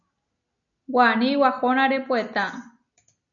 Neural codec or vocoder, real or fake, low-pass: none; real; 7.2 kHz